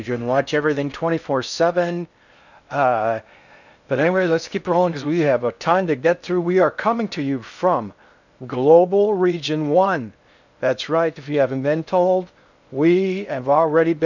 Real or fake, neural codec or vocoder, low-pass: fake; codec, 16 kHz in and 24 kHz out, 0.6 kbps, FocalCodec, streaming, 4096 codes; 7.2 kHz